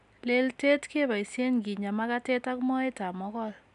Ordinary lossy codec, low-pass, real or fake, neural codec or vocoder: none; 10.8 kHz; real; none